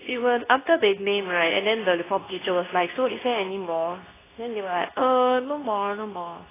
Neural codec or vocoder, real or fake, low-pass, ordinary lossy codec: codec, 24 kHz, 0.9 kbps, WavTokenizer, medium speech release version 2; fake; 3.6 kHz; AAC, 16 kbps